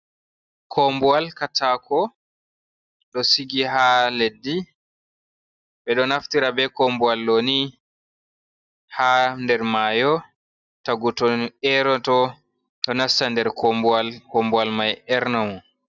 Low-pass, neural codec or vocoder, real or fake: 7.2 kHz; none; real